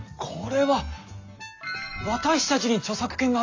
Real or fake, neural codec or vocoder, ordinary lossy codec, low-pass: real; none; AAC, 48 kbps; 7.2 kHz